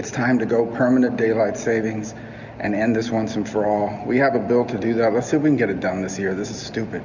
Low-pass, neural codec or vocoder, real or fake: 7.2 kHz; none; real